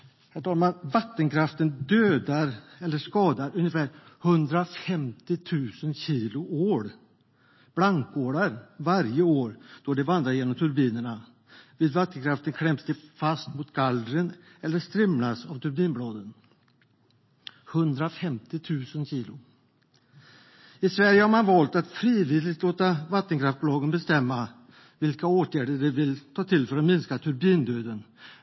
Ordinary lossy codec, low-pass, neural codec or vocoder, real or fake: MP3, 24 kbps; 7.2 kHz; none; real